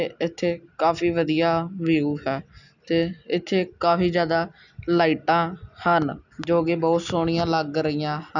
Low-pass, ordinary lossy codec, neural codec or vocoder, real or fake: 7.2 kHz; none; none; real